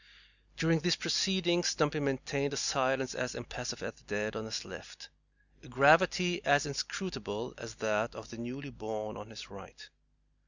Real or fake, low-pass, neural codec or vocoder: real; 7.2 kHz; none